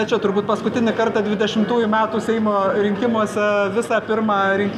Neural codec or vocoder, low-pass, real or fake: none; 14.4 kHz; real